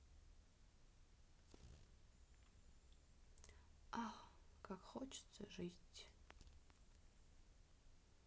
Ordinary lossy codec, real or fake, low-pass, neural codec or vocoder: none; real; none; none